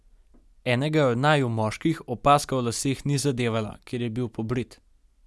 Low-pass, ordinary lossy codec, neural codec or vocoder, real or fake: none; none; none; real